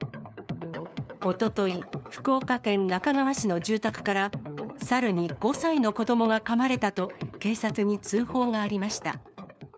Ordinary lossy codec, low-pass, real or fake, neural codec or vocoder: none; none; fake; codec, 16 kHz, 4 kbps, FunCodec, trained on LibriTTS, 50 frames a second